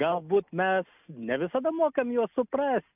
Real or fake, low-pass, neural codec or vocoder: real; 3.6 kHz; none